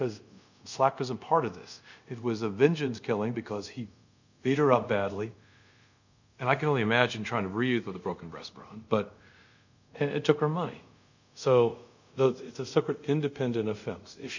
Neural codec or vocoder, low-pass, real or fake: codec, 24 kHz, 0.5 kbps, DualCodec; 7.2 kHz; fake